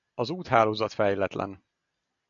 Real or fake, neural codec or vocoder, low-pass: real; none; 7.2 kHz